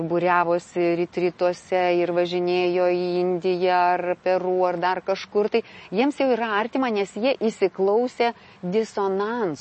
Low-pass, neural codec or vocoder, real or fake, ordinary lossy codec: 10.8 kHz; none; real; MP3, 32 kbps